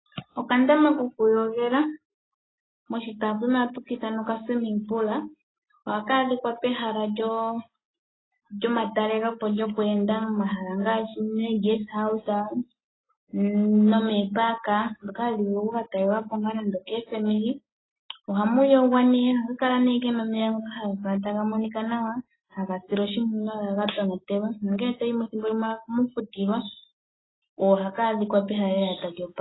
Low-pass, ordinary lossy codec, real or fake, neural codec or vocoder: 7.2 kHz; AAC, 16 kbps; real; none